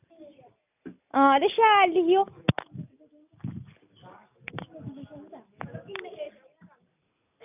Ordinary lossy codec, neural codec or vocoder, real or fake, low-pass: none; none; real; 3.6 kHz